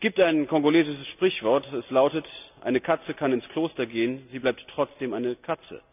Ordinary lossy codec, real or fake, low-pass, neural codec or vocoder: none; real; 3.6 kHz; none